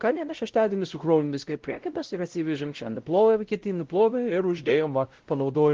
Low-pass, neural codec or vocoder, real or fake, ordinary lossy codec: 7.2 kHz; codec, 16 kHz, 0.5 kbps, X-Codec, WavLM features, trained on Multilingual LibriSpeech; fake; Opus, 16 kbps